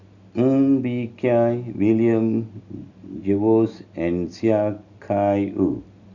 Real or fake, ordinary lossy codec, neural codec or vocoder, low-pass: real; none; none; 7.2 kHz